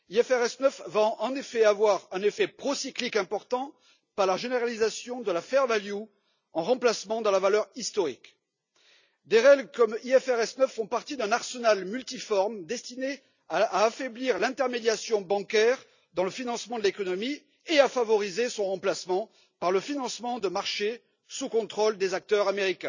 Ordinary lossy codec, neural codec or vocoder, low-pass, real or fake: none; none; 7.2 kHz; real